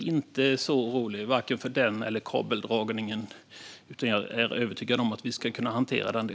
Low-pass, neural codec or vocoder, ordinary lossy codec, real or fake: none; none; none; real